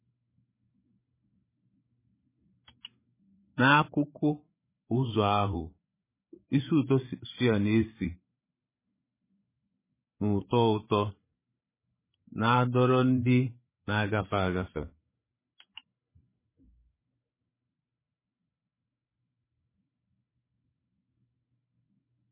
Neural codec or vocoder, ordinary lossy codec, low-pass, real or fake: codec, 16 kHz, 8 kbps, FreqCodec, larger model; MP3, 16 kbps; 3.6 kHz; fake